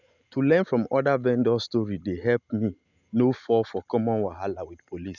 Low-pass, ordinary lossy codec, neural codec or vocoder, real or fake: 7.2 kHz; none; none; real